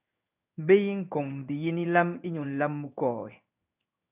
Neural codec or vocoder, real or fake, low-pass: codec, 16 kHz in and 24 kHz out, 1 kbps, XY-Tokenizer; fake; 3.6 kHz